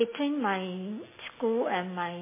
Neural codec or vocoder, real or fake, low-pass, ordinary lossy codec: none; real; 3.6 kHz; MP3, 16 kbps